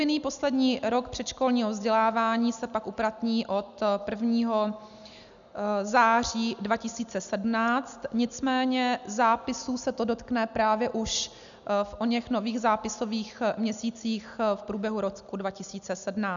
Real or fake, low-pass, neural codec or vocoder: real; 7.2 kHz; none